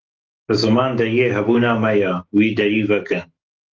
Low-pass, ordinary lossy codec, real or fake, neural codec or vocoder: 7.2 kHz; Opus, 16 kbps; fake; autoencoder, 48 kHz, 128 numbers a frame, DAC-VAE, trained on Japanese speech